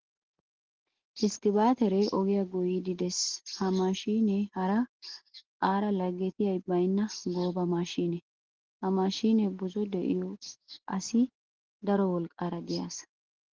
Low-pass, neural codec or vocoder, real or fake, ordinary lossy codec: 7.2 kHz; none; real; Opus, 16 kbps